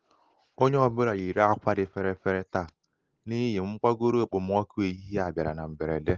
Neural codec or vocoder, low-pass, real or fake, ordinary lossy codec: none; 7.2 kHz; real; Opus, 16 kbps